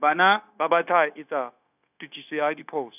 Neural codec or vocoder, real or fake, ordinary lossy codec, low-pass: codec, 16 kHz, 0.9 kbps, LongCat-Audio-Codec; fake; none; 3.6 kHz